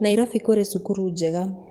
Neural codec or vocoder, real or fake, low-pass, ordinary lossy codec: codec, 44.1 kHz, 7.8 kbps, DAC; fake; 19.8 kHz; Opus, 32 kbps